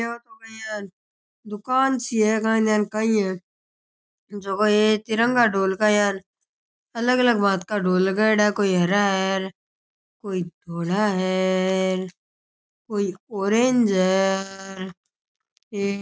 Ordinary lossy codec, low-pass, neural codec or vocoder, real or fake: none; none; none; real